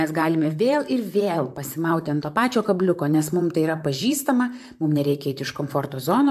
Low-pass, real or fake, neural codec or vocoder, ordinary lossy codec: 14.4 kHz; fake; vocoder, 44.1 kHz, 128 mel bands, Pupu-Vocoder; AAC, 96 kbps